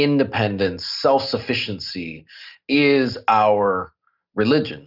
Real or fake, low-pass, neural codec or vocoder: real; 5.4 kHz; none